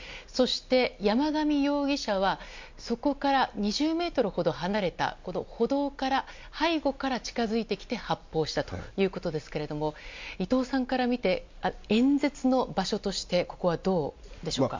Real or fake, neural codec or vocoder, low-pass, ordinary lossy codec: real; none; 7.2 kHz; MP3, 64 kbps